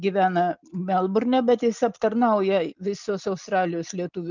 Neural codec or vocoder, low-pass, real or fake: none; 7.2 kHz; real